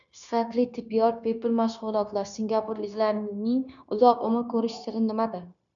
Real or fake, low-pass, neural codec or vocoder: fake; 7.2 kHz; codec, 16 kHz, 0.9 kbps, LongCat-Audio-Codec